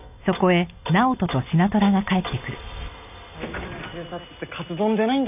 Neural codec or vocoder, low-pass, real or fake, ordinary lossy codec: none; 3.6 kHz; real; none